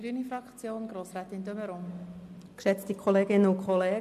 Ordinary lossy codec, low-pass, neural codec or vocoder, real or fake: MP3, 64 kbps; 14.4 kHz; none; real